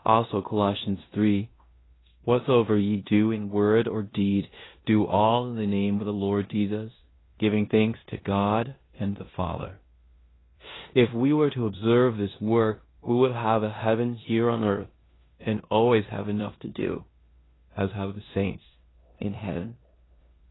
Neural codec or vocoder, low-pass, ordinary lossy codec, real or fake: codec, 16 kHz in and 24 kHz out, 0.9 kbps, LongCat-Audio-Codec, four codebook decoder; 7.2 kHz; AAC, 16 kbps; fake